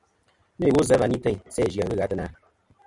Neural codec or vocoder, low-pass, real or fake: none; 10.8 kHz; real